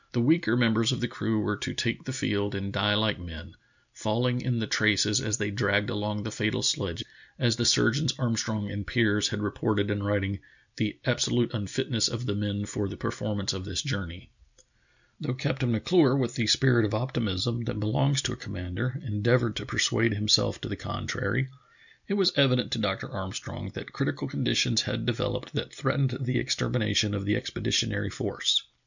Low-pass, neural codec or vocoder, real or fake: 7.2 kHz; none; real